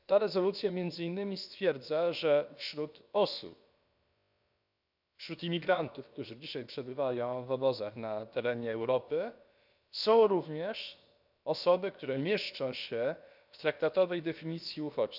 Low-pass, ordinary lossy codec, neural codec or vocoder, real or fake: 5.4 kHz; none; codec, 16 kHz, about 1 kbps, DyCAST, with the encoder's durations; fake